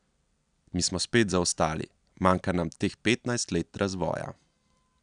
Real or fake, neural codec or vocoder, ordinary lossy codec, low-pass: real; none; none; 9.9 kHz